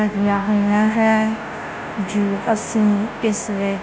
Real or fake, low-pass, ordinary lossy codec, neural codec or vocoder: fake; none; none; codec, 16 kHz, 0.5 kbps, FunCodec, trained on Chinese and English, 25 frames a second